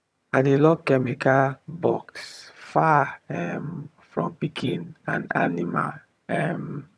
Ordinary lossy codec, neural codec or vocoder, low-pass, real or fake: none; vocoder, 22.05 kHz, 80 mel bands, HiFi-GAN; none; fake